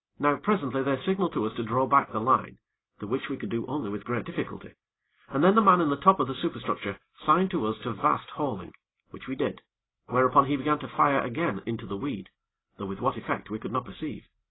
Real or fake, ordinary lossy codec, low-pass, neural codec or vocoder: real; AAC, 16 kbps; 7.2 kHz; none